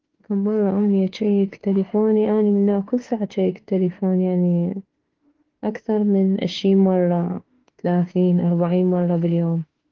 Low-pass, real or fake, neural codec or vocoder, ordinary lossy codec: 7.2 kHz; fake; autoencoder, 48 kHz, 32 numbers a frame, DAC-VAE, trained on Japanese speech; Opus, 16 kbps